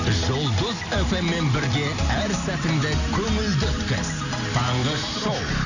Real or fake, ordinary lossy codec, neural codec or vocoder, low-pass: real; none; none; 7.2 kHz